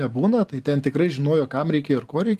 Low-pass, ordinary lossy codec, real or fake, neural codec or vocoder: 14.4 kHz; Opus, 32 kbps; real; none